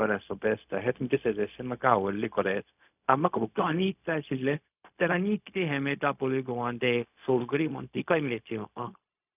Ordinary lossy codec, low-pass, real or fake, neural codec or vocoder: none; 3.6 kHz; fake; codec, 16 kHz, 0.4 kbps, LongCat-Audio-Codec